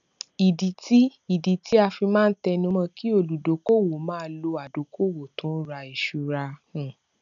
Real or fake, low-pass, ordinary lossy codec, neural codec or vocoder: real; 7.2 kHz; none; none